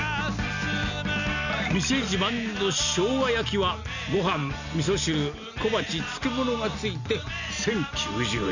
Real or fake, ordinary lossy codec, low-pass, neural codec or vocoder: real; none; 7.2 kHz; none